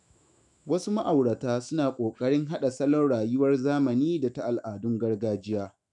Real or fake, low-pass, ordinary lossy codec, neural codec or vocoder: fake; 10.8 kHz; none; autoencoder, 48 kHz, 128 numbers a frame, DAC-VAE, trained on Japanese speech